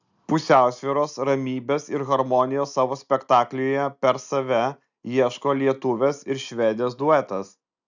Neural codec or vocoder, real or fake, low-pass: none; real; 7.2 kHz